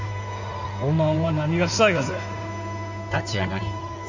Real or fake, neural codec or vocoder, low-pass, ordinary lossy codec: fake; codec, 16 kHz in and 24 kHz out, 2.2 kbps, FireRedTTS-2 codec; 7.2 kHz; none